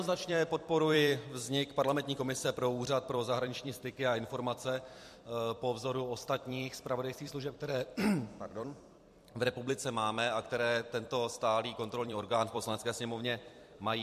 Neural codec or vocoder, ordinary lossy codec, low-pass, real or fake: vocoder, 44.1 kHz, 128 mel bands every 256 samples, BigVGAN v2; MP3, 64 kbps; 14.4 kHz; fake